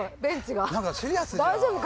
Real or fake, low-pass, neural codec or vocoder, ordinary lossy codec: real; none; none; none